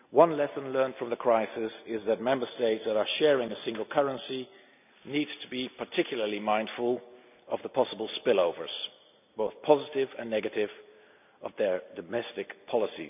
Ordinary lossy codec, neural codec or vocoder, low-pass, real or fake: none; none; 3.6 kHz; real